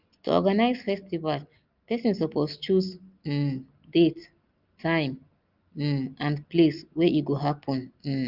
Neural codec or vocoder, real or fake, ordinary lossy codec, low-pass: none; real; Opus, 32 kbps; 5.4 kHz